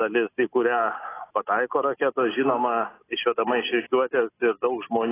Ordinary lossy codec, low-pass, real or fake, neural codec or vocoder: AAC, 16 kbps; 3.6 kHz; real; none